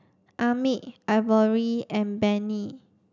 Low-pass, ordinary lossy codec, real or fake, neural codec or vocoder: 7.2 kHz; none; real; none